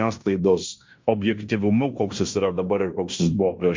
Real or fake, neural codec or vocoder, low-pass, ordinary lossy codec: fake; codec, 16 kHz in and 24 kHz out, 0.9 kbps, LongCat-Audio-Codec, fine tuned four codebook decoder; 7.2 kHz; MP3, 48 kbps